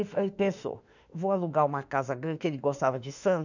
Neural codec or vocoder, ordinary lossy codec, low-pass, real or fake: autoencoder, 48 kHz, 32 numbers a frame, DAC-VAE, trained on Japanese speech; none; 7.2 kHz; fake